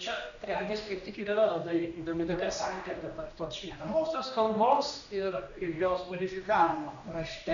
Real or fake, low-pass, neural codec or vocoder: fake; 7.2 kHz; codec, 16 kHz, 1 kbps, X-Codec, HuBERT features, trained on general audio